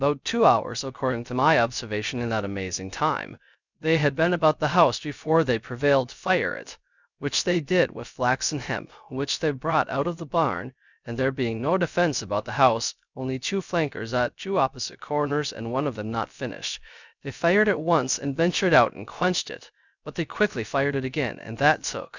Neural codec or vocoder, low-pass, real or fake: codec, 16 kHz, 0.3 kbps, FocalCodec; 7.2 kHz; fake